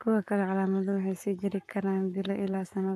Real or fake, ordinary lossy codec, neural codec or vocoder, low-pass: fake; AAC, 96 kbps; codec, 44.1 kHz, 7.8 kbps, Pupu-Codec; 14.4 kHz